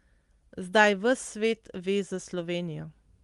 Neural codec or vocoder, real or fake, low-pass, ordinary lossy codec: none; real; 10.8 kHz; Opus, 24 kbps